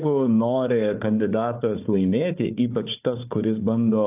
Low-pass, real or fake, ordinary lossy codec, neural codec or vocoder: 3.6 kHz; fake; AAC, 32 kbps; codec, 16 kHz, 4 kbps, FunCodec, trained on Chinese and English, 50 frames a second